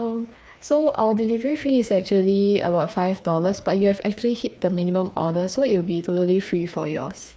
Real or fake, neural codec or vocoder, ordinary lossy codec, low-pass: fake; codec, 16 kHz, 2 kbps, FreqCodec, larger model; none; none